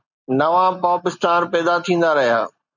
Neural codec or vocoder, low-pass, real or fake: none; 7.2 kHz; real